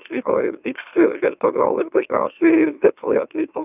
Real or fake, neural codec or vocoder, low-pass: fake; autoencoder, 44.1 kHz, a latent of 192 numbers a frame, MeloTTS; 3.6 kHz